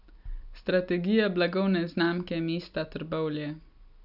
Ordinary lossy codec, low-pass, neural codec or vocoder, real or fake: none; 5.4 kHz; none; real